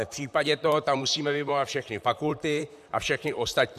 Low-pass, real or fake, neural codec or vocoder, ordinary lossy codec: 14.4 kHz; fake; vocoder, 44.1 kHz, 128 mel bands, Pupu-Vocoder; AAC, 96 kbps